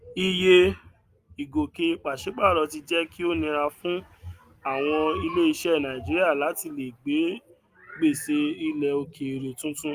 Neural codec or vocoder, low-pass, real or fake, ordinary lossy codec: none; 14.4 kHz; real; Opus, 32 kbps